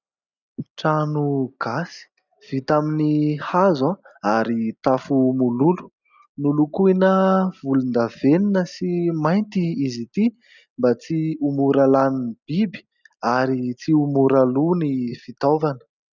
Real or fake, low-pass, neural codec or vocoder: real; 7.2 kHz; none